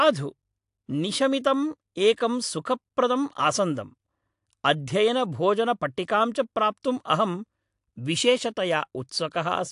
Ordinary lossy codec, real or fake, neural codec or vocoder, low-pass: AAC, 64 kbps; real; none; 10.8 kHz